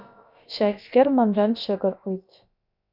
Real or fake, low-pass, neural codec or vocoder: fake; 5.4 kHz; codec, 16 kHz, about 1 kbps, DyCAST, with the encoder's durations